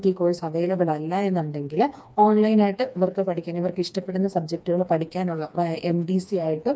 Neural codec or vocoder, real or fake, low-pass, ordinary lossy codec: codec, 16 kHz, 2 kbps, FreqCodec, smaller model; fake; none; none